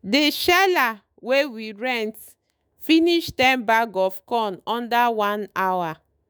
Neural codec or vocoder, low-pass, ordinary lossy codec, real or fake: autoencoder, 48 kHz, 128 numbers a frame, DAC-VAE, trained on Japanese speech; none; none; fake